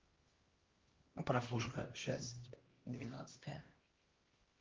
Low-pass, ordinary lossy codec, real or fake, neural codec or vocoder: 7.2 kHz; Opus, 16 kbps; fake; codec, 16 kHz, 1 kbps, X-Codec, HuBERT features, trained on LibriSpeech